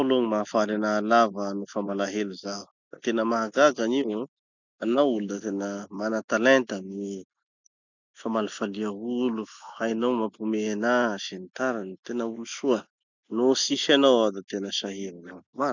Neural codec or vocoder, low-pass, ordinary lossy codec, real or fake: none; 7.2 kHz; none; real